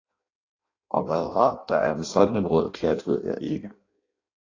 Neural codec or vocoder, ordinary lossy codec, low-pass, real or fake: codec, 16 kHz in and 24 kHz out, 0.6 kbps, FireRedTTS-2 codec; AAC, 32 kbps; 7.2 kHz; fake